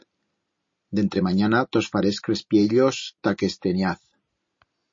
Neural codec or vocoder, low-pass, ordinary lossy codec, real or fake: none; 7.2 kHz; MP3, 32 kbps; real